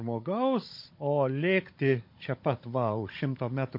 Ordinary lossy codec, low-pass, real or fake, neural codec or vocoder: MP3, 32 kbps; 5.4 kHz; fake; codec, 16 kHz, 16 kbps, FunCodec, trained on Chinese and English, 50 frames a second